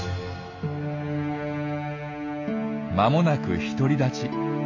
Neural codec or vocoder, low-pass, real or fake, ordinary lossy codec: none; 7.2 kHz; real; AAC, 48 kbps